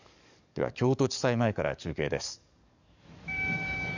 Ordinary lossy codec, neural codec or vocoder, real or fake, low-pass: none; codec, 44.1 kHz, 7.8 kbps, DAC; fake; 7.2 kHz